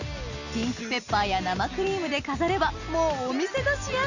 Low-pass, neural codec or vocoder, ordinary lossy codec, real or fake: 7.2 kHz; none; Opus, 64 kbps; real